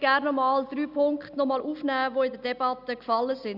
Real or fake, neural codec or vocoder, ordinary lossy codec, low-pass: real; none; none; 5.4 kHz